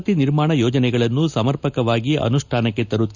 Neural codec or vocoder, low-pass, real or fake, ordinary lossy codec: none; 7.2 kHz; real; none